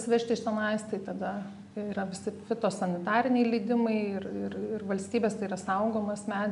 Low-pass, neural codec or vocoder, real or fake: 10.8 kHz; none; real